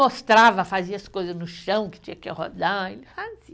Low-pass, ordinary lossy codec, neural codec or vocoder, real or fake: none; none; none; real